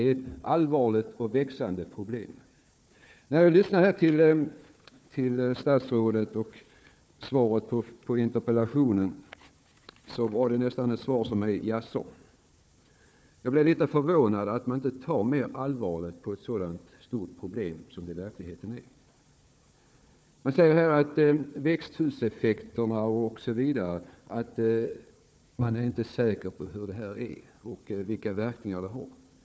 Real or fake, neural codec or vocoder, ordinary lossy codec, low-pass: fake; codec, 16 kHz, 4 kbps, FunCodec, trained on Chinese and English, 50 frames a second; none; none